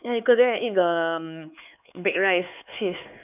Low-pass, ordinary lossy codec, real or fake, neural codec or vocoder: 3.6 kHz; none; fake; codec, 16 kHz, 4 kbps, X-Codec, HuBERT features, trained on LibriSpeech